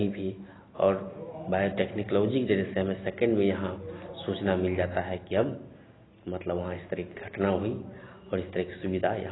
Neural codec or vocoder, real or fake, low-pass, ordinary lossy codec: none; real; 7.2 kHz; AAC, 16 kbps